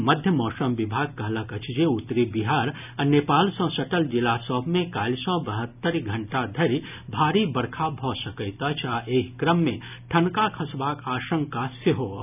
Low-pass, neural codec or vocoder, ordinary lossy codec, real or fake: 3.6 kHz; none; none; real